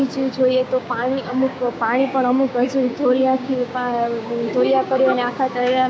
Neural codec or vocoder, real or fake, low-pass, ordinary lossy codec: codec, 16 kHz, 6 kbps, DAC; fake; none; none